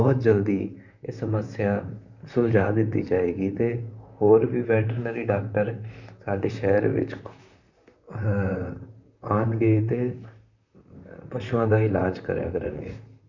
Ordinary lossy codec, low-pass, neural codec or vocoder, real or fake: none; 7.2 kHz; vocoder, 44.1 kHz, 128 mel bands, Pupu-Vocoder; fake